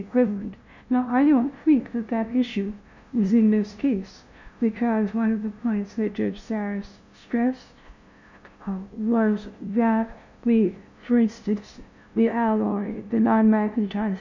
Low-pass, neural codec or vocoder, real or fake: 7.2 kHz; codec, 16 kHz, 0.5 kbps, FunCodec, trained on LibriTTS, 25 frames a second; fake